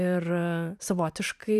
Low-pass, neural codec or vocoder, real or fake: 14.4 kHz; vocoder, 44.1 kHz, 128 mel bands every 512 samples, BigVGAN v2; fake